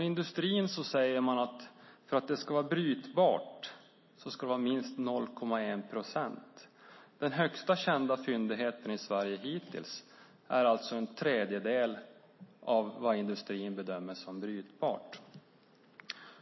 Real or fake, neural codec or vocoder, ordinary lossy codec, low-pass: real; none; MP3, 24 kbps; 7.2 kHz